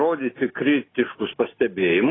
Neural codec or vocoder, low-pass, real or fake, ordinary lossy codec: none; 7.2 kHz; real; AAC, 16 kbps